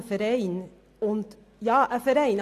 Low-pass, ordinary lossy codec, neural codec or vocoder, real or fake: 14.4 kHz; AAC, 64 kbps; none; real